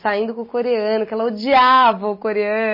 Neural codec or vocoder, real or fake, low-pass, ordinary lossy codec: none; real; 5.4 kHz; MP3, 24 kbps